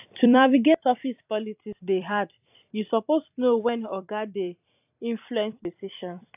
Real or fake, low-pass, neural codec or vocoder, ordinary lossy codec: fake; 3.6 kHz; vocoder, 44.1 kHz, 128 mel bands every 256 samples, BigVGAN v2; AAC, 32 kbps